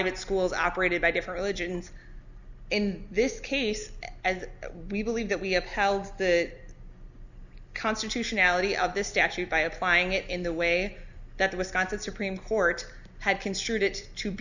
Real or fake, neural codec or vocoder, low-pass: real; none; 7.2 kHz